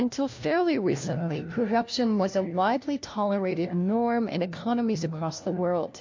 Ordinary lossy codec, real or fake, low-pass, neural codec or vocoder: MP3, 48 kbps; fake; 7.2 kHz; codec, 16 kHz, 1 kbps, FunCodec, trained on LibriTTS, 50 frames a second